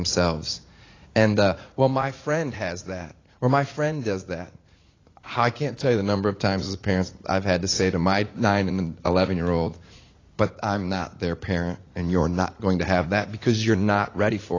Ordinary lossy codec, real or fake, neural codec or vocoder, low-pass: AAC, 32 kbps; fake; vocoder, 44.1 kHz, 80 mel bands, Vocos; 7.2 kHz